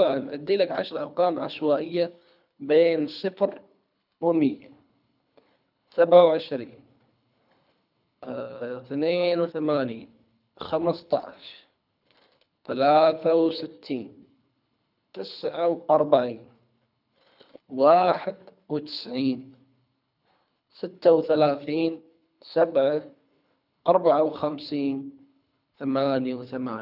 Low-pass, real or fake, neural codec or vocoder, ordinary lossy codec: 5.4 kHz; fake; codec, 24 kHz, 3 kbps, HILCodec; none